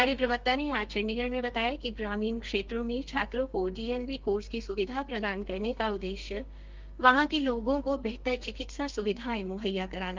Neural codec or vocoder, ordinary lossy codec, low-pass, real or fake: codec, 32 kHz, 1.9 kbps, SNAC; Opus, 32 kbps; 7.2 kHz; fake